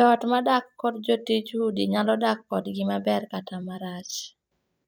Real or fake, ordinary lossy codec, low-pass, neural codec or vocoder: fake; none; none; vocoder, 44.1 kHz, 128 mel bands every 256 samples, BigVGAN v2